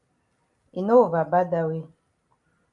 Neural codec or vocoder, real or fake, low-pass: none; real; 10.8 kHz